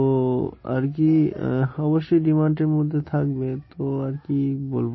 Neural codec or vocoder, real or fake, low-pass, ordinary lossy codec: none; real; 7.2 kHz; MP3, 24 kbps